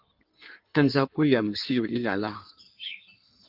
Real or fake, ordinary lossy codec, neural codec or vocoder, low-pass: fake; Opus, 32 kbps; codec, 16 kHz in and 24 kHz out, 1.1 kbps, FireRedTTS-2 codec; 5.4 kHz